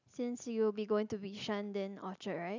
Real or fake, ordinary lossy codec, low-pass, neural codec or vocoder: real; none; 7.2 kHz; none